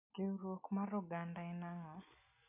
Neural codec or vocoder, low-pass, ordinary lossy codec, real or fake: none; 3.6 kHz; none; real